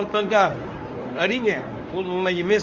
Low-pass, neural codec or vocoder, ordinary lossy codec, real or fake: 7.2 kHz; codec, 24 kHz, 0.9 kbps, WavTokenizer, medium speech release version 1; Opus, 32 kbps; fake